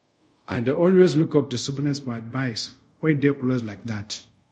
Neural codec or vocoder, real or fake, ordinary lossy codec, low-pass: codec, 24 kHz, 0.5 kbps, DualCodec; fake; MP3, 48 kbps; 10.8 kHz